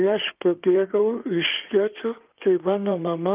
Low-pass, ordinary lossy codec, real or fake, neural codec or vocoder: 3.6 kHz; Opus, 32 kbps; fake; codec, 16 kHz in and 24 kHz out, 2.2 kbps, FireRedTTS-2 codec